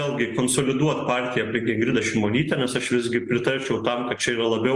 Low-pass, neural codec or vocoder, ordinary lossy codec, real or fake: 10.8 kHz; none; Opus, 24 kbps; real